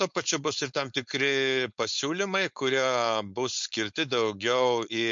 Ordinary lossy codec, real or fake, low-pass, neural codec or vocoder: MP3, 48 kbps; fake; 7.2 kHz; codec, 16 kHz, 4.8 kbps, FACodec